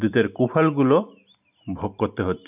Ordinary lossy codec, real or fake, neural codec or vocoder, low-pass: none; real; none; 3.6 kHz